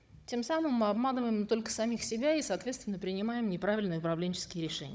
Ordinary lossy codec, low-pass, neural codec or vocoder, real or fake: none; none; codec, 16 kHz, 16 kbps, FunCodec, trained on Chinese and English, 50 frames a second; fake